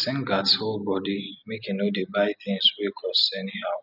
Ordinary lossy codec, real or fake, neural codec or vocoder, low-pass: none; real; none; 5.4 kHz